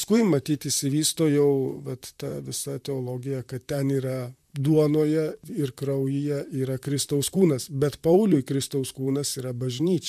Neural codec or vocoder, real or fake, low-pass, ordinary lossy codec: vocoder, 44.1 kHz, 128 mel bands, Pupu-Vocoder; fake; 14.4 kHz; MP3, 96 kbps